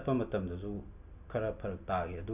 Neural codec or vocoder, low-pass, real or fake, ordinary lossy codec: none; 3.6 kHz; real; none